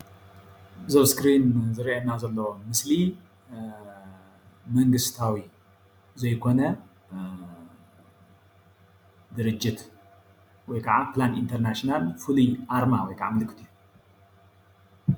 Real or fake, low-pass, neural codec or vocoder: real; 19.8 kHz; none